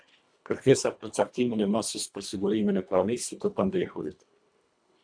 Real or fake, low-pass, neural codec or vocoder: fake; 9.9 kHz; codec, 24 kHz, 1.5 kbps, HILCodec